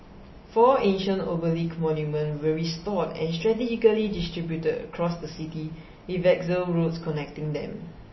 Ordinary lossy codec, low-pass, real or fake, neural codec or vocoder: MP3, 24 kbps; 7.2 kHz; real; none